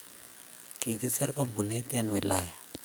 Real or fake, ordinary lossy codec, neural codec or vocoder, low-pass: fake; none; codec, 44.1 kHz, 2.6 kbps, SNAC; none